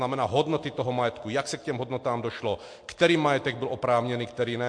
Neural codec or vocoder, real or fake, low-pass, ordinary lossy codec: none; real; 9.9 kHz; MP3, 48 kbps